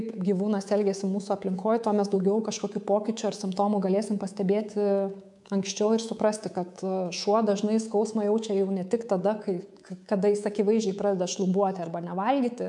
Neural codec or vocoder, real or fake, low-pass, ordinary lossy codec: codec, 24 kHz, 3.1 kbps, DualCodec; fake; 10.8 kHz; MP3, 96 kbps